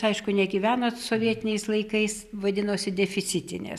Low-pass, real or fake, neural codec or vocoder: 14.4 kHz; real; none